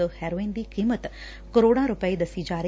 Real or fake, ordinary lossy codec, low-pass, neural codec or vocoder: real; none; none; none